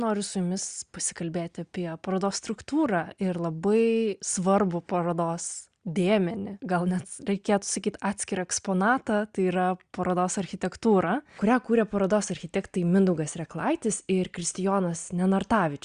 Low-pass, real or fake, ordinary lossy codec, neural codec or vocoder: 9.9 kHz; real; Opus, 64 kbps; none